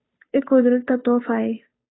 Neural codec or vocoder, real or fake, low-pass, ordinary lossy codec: codec, 16 kHz, 2 kbps, FunCodec, trained on Chinese and English, 25 frames a second; fake; 7.2 kHz; AAC, 16 kbps